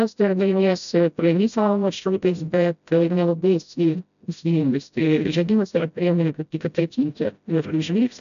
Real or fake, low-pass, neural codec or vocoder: fake; 7.2 kHz; codec, 16 kHz, 0.5 kbps, FreqCodec, smaller model